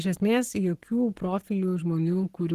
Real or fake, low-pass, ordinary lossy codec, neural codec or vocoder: fake; 14.4 kHz; Opus, 16 kbps; codec, 44.1 kHz, 7.8 kbps, Pupu-Codec